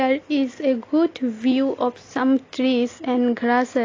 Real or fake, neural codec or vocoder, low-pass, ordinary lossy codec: real; none; 7.2 kHz; AAC, 32 kbps